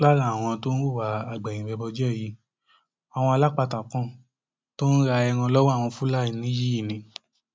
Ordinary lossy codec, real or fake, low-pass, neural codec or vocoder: none; real; none; none